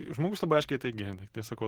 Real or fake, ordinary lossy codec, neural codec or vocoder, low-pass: real; Opus, 16 kbps; none; 19.8 kHz